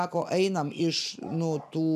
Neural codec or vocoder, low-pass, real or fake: none; 14.4 kHz; real